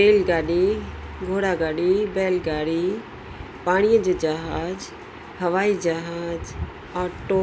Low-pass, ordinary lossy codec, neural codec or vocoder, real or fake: none; none; none; real